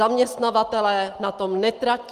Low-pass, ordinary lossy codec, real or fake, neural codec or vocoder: 14.4 kHz; Opus, 32 kbps; real; none